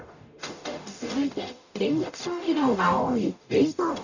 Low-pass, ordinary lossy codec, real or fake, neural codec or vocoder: 7.2 kHz; none; fake; codec, 44.1 kHz, 0.9 kbps, DAC